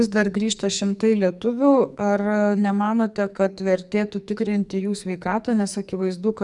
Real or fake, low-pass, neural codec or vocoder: fake; 10.8 kHz; codec, 44.1 kHz, 2.6 kbps, SNAC